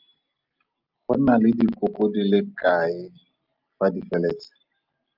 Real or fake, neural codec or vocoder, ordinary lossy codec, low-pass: real; none; Opus, 24 kbps; 5.4 kHz